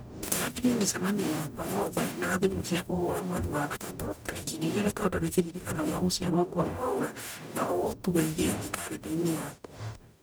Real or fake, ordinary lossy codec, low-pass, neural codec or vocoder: fake; none; none; codec, 44.1 kHz, 0.9 kbps, DAC